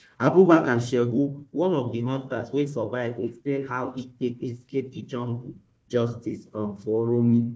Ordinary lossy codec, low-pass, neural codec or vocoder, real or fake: none; none; codec, 16 kHz, 1 kbps, FunCodec, trained on Chinese and English, 50 frames a second; fake